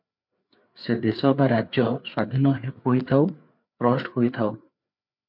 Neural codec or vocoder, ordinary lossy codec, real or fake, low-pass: codec, 16 kHz, 4 kbps, FreqCodec, larger model; MP3, 48 kbps; fake; 5.4 kHz